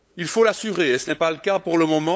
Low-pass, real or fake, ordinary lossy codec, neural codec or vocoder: none; fake; none; codec, 16 kHz, 8 kbps, FunCodec, trained on LibriTTS, 25 frames a second